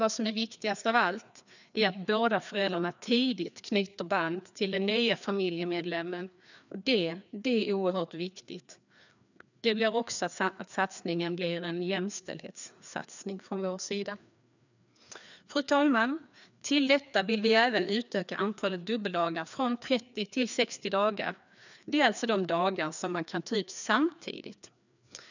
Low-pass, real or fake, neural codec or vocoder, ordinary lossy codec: 7.2 kHz; fake; codec, 16 kHz, 2 kbps, FreqCodec, larger model; none